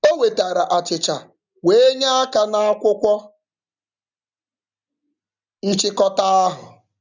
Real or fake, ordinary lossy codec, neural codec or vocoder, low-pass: real; none; none; 7.2 kHz